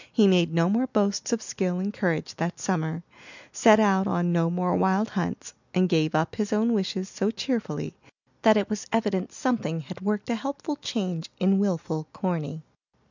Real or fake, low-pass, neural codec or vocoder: real; 7.2 kHz; none